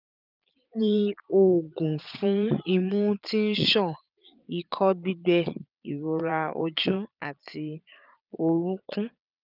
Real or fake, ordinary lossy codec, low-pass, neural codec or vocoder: fake; none; 5.4 kHz; vocoder, 22.05 kHz, 80 mel bands, Vocos